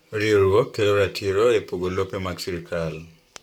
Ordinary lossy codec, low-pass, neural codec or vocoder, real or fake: none; 19.8 kHz; codec, 44.1 kHz, 7.8 kbps, Pupu-Codec; fake